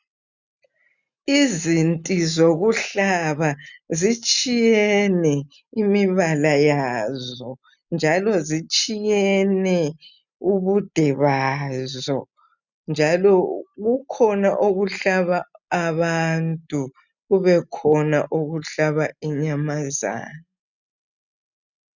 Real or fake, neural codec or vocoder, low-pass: real; none; 7.2 kHz